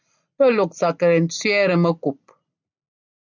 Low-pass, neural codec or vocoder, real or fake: 7.2 kHz; none; real